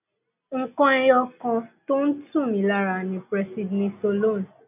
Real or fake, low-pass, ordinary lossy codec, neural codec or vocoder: real; 3.6 kHz; none; none